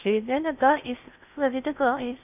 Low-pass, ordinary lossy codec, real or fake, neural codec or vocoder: 3.6 kHz; none; fake; codec, 16 kHz in and 24 kHz out, 0.6 kbps, FocalCodec, streaming, 2048 codes